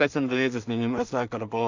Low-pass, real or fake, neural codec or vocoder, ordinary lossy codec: 7.2 kHz; fake; codec, 16 kHz in and 24 kHz out, 0.4 kbps, LongCat-Audio-Codec, two codebook decoder; Opus, 64 kbps